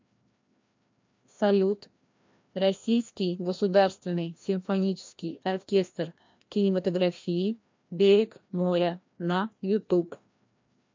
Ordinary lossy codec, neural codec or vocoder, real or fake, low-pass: MP3, 48 kbps; codec, 16 kHz, 1 kbps, FreqCodec, larger model; fake; 7.2 kHz